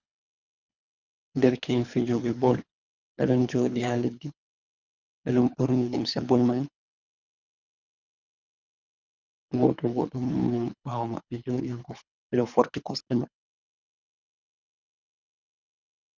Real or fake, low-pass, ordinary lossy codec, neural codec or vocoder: fake; 7.2 kHz; Opus, 64 kbps; codec, 24 kHz, 3 kbps, HILCodec